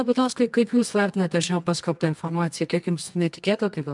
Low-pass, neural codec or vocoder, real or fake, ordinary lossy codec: 10.8 kHz; codec, 24 kHz, 0.9 kbps, WavTokenizer, medium music audio release; fake; MP3, 96 kbps